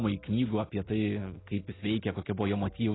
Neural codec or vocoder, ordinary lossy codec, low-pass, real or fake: none; AAC, 16 kbps; 7.2 kHz; real